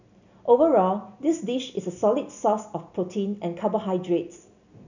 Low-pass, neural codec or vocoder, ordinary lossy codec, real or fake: 7.2 kHz; none; none; real